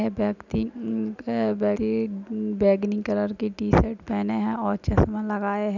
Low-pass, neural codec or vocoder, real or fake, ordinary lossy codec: 7.2 kHz; none; real; none